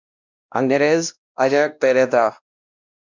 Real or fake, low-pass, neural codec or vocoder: fake; 7.2 kHz; codec, 16 kHz, 1 kbps, X-Codec, WavLM features, trained on Multilingual LibriSpeech